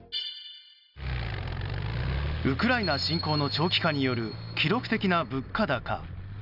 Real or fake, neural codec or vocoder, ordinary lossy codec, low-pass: real; none; none; 5.4 kHz